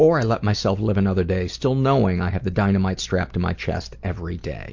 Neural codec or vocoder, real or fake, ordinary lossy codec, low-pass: none; real; MP3, 48 kbps; 7.2 kHz